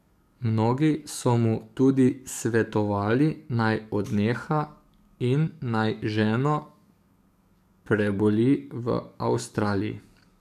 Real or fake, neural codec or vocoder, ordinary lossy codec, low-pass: fake; codec, 44.1 kHz, 7.8 kbps, DAC; none; 14.4 kHz